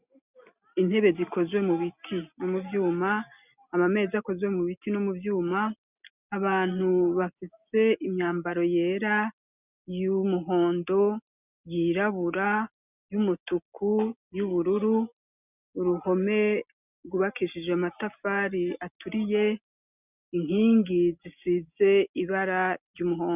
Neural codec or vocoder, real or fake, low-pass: none; real; 3.6 kHz